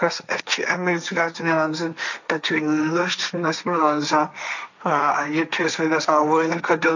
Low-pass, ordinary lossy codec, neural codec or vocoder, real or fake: 7.2 kHz; none; codec, 16 kHz, 1.1 kbps, Voila-Tokenizer; fake